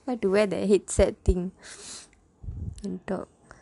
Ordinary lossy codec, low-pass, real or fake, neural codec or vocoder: none; 10.8 kHz; real; none